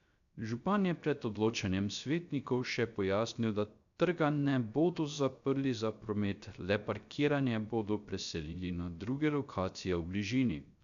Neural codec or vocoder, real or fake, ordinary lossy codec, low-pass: codec, 16 kHz, 0.3 kbps, FocalCodec; fake; none; 7.2 kHz